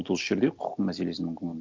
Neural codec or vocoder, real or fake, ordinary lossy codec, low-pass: none; real; Opus, 32 kbps; 7.2 kHz